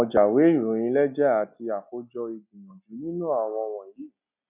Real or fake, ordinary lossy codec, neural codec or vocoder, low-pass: real; none; none; 3.6 kHz